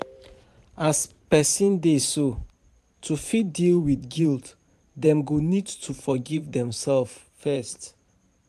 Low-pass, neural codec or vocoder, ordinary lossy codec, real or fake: 14.4 kHz; vocoder, 44.1 kHz, 128 mel bands every 512 samples, BigVGAN v2; AAC, 96 kbps; fake